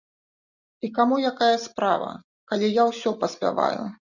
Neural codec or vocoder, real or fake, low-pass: none; real; 7.2 kHz